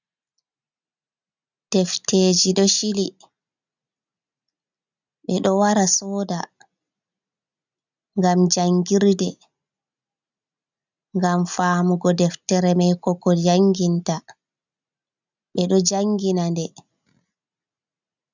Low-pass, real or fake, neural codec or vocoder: 7.2 kHz; real; none